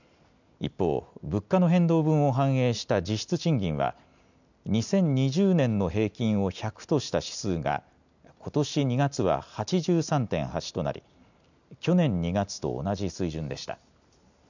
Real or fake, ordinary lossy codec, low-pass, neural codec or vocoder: real; none; 7.2 kHz; none